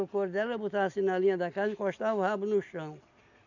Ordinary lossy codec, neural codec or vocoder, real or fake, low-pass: none; none; real; 7.2 kHz